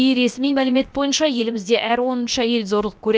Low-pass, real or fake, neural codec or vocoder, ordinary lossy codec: none; fake; codec, 16 kHz, about 1 kbps, DyCAST, with the encoder's durations; none